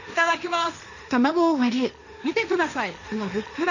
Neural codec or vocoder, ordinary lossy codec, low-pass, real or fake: codec, 16 kHz, 1.1 kbps, Voila-Tokenizer; none; 7.2 kHz; fake